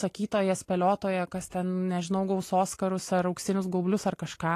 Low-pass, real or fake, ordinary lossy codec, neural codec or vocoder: 14.4 kHz; real; AAC, 48 kbps; none